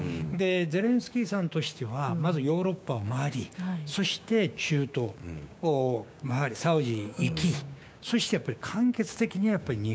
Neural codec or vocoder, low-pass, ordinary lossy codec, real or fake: codec, 16 kHz, 6 kbps, DAC; none; none; fake